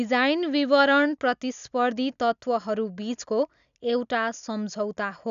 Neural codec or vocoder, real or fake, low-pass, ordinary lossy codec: none; real; 7.2 kHz; none